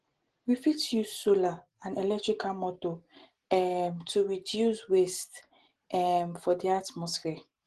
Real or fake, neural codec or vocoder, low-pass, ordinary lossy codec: real; none; 10.8 kHz; Opus, 16 kbps